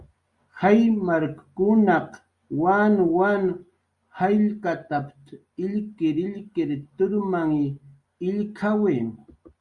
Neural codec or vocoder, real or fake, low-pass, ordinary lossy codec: none; real; 10.8 kHz; Opus, 64 kbps